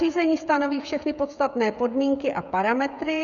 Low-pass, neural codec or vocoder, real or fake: 7.2 kHz; codec, 16 kHz, 16 kbps, FreqCodec, smaller model; fake